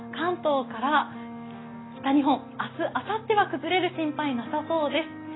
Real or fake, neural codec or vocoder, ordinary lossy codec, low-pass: real; none; AAC, 16 kbps; 7.2 kHz